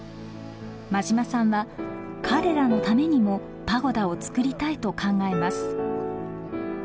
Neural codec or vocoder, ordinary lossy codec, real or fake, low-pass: none; none; real; none